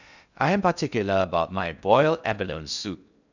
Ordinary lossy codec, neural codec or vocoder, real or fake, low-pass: none; codec, 16 kHz in and 24 kHz out, 0.6 kbps, FocalCodec, streaming, 2048 codes; fake; 7.2 kHz